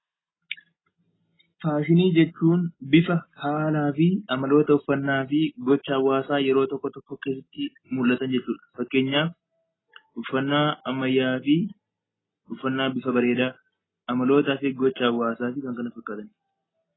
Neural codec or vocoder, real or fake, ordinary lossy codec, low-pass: none; real; AAC, 16 kbps; 7.2 kHz